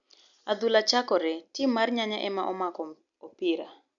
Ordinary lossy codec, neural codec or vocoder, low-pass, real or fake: none; none; 7.2 kHz; real